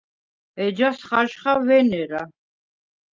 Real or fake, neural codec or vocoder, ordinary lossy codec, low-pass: real; none; Opus, 32 kbps; 7.2 kHz